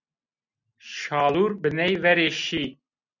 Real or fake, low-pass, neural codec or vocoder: real; 7.2 kHz; none